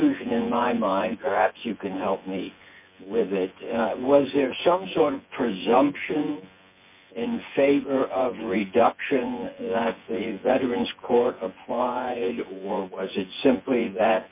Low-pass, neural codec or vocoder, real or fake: 3.6 kHz; vocoder, 24 kHz, 100 mel bands, Vocos; fake